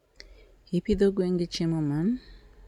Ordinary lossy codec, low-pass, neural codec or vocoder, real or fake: none; 19.8 kHz; none; real